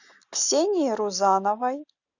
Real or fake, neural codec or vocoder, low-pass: real; none; 7.2 kHz